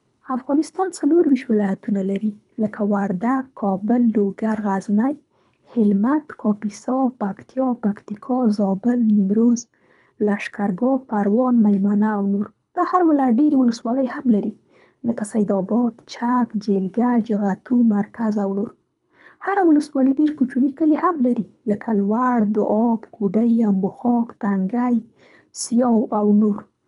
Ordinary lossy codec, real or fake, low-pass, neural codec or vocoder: none; fake; 10.8 kHz; codec, 24 kHz, 3 kbps, HILCodec